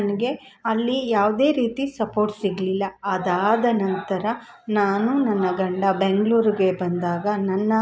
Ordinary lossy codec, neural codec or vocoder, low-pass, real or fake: none; none; none; real